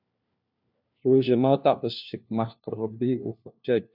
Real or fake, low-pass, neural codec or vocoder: fake; 5.4 kHz; codec, 16 kHz, 1 kbps, FunCodec, trained on LibriTTS, 50 frames a second